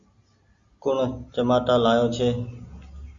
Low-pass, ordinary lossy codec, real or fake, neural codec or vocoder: 7.2 kHz; Opus, 64 kbps; real; none